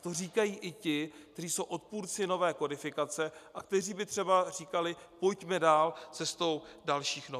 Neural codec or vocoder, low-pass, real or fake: none; 14.4 kHz; real